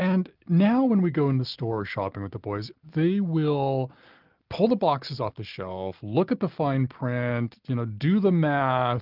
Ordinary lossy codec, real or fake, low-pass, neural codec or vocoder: Opus, 24 kbps; real; 5.4 kHz; none